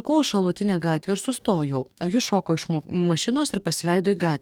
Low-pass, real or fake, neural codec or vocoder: 19.8 kHz; fake; codec, 44.1 kHz, 2.6 kbps, DAC